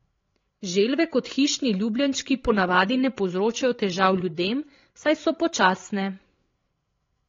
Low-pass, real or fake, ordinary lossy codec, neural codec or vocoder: 7.2 kHz; fake; AAC, 32 kbps; codec, 16 kHz, 16 kbps, FreqCodec, larger model